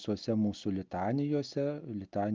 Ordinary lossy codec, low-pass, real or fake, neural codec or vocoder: Opus, 32 kbps; 7.2 kHz; real; none